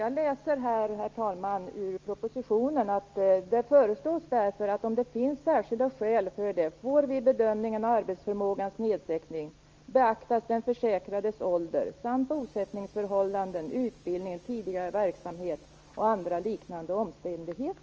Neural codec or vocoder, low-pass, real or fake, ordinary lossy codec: none; 7.2 kHz; real; Opus, 24 kbps